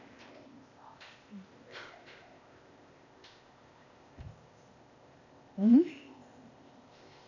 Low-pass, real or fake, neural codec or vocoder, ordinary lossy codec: 7.2 kHz; fake; codec, 16 kHz, 0.8 kbps, ZipCodec; none